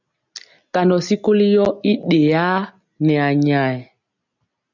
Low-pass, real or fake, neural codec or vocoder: 7.2 kHz; real; none